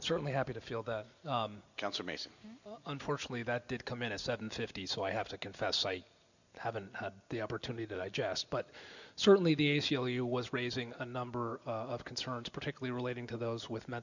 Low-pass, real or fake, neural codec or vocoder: 7.2 kHz; real; none